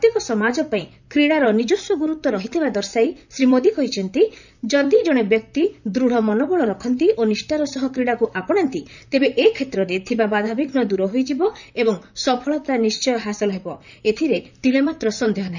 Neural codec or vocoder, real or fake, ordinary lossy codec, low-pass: vocoder, 44.1 kHz, 128 mel bands, Pupu-Vocoder; fake; none; 7.2 kHz